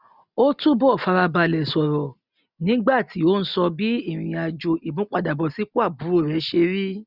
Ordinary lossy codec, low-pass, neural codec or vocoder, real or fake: none; 5.4 kHz; none; real